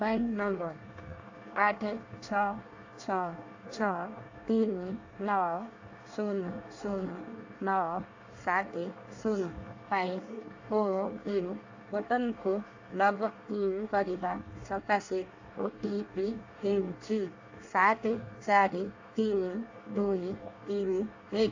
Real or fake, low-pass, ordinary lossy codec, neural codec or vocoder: fake; 7.2 kHz; AAC, 48 kbps; codec, 24 kHz, 1 kbps, SNAC